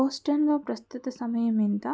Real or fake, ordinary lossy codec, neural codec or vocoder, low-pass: real; none; none; none